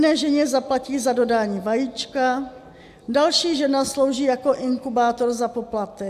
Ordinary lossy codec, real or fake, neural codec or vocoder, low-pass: AAC, 96 kbps; real; none; 14.4 kHz